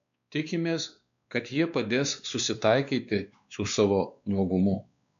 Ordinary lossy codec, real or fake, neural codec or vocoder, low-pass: MP3, 96 kbps; fake; codec, 16 kHz, 2 kbps, X-Codec, WavLM features, trained on Multilingual LibriSpeech; 7.2 kHz